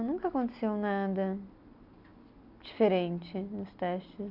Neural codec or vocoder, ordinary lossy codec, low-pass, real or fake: none; none; 5.4 kHz; real